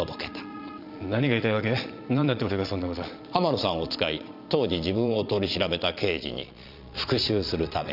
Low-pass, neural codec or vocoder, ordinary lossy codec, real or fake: 5.4 kHz; vocoder, 22.05 kHz, 80 mel bands, Vocos; none; fake